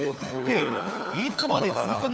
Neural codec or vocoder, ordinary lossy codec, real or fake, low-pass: codec, 16 kHz, 4 kbps, FunCodec, trained on LibriTTS, 50 frames a second; none; fake; none